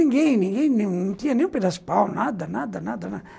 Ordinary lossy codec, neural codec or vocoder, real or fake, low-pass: none; none; real; none